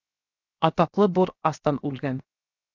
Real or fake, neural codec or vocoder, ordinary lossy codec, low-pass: fake; codec, 16 kHz, 0.7 kbps, FocalCodec; AAC, 48 kbps; 7.2 kHz